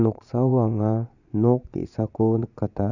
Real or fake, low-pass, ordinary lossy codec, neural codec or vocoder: fake; 7.2 kHz; none; vocoder, 22.05 kHz, 80 mel bands, Vocos